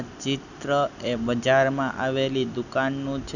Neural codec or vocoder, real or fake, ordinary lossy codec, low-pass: none; real; none; 7.2 kHz